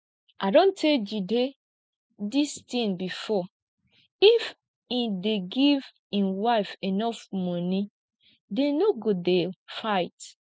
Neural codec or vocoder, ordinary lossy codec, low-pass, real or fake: none; none; none; real